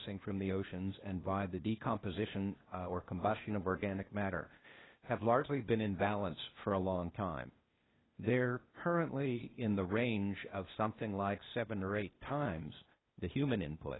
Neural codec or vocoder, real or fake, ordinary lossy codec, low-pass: codec, 16 kHz, 0.8 kbps, ZipCodec; fake; AAC, 16 kbps; 7.2 kHz